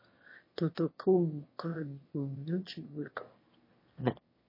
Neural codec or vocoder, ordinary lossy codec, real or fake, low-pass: autoencoder, 22.05 kHz, a latent of 192 numbers a frame, VITS, trained on one speaker; MP3, 24 kbps; fake; 5.4 kHz